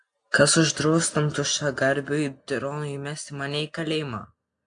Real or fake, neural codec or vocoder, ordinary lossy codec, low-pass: real; none; AAC, 64 kbps; 9.9 kHz